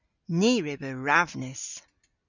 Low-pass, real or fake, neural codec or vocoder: 7.2 kHz; real; none